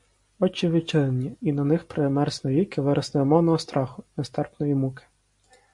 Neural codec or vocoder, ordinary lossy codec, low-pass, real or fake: none; MP3, 48 kbps; 10.8 kHz; real